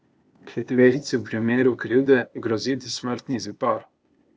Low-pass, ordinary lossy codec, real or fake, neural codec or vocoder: none; none; fake; codec, 16 kHz, 0.8 kbps, ZipCodec